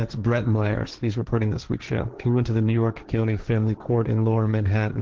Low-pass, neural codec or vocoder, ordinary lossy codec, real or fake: 7.2 kHz; codec, 16 kHz, 1.1 kbps, Voila-Tokenizer; Opus, 16 kbps; fake